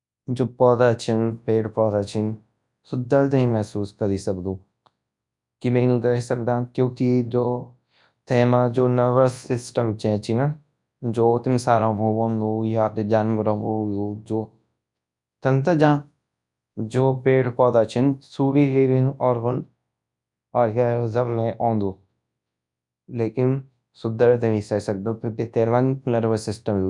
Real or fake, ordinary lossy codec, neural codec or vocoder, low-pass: fake; none; codec, 24 kHz, 0.9 kbps, WavTokenizer, large speech release; 10.8 kHz